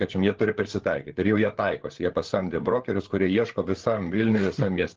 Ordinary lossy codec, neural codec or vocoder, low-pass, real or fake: Opus, 16 kbps; codec, 16 kHz, 8 kbps, FreqCodec, larger model; 7.2 kHz; fake